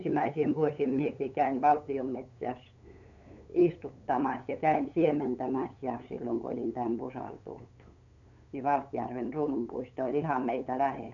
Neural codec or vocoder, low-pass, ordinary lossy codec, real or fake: codec, 16 kHz, 8 kbps, FunCodec, trained on LibriTTS, 25 frames a second; 7.2 kHz; none; fake